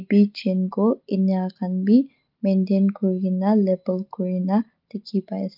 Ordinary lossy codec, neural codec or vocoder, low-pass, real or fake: Opus, 32 kbps; none; 5.4 kHz; real